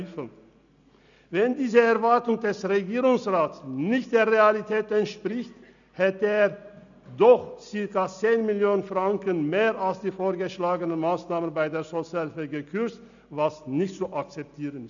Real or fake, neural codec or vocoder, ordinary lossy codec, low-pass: real; none; none; 7.2 kHz